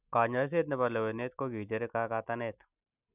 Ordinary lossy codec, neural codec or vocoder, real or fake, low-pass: none; none; real; 3.6 kHz